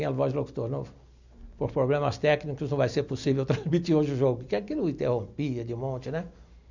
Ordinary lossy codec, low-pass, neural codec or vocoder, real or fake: none; 7.2 kHz; none; real